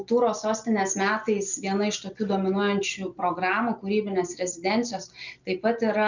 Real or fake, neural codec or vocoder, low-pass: real; none; 7.2 kHz